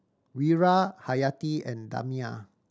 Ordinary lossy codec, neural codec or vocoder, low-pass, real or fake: none; none; none; real